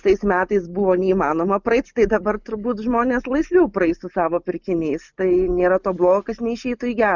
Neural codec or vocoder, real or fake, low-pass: none; real; 7.2 kHz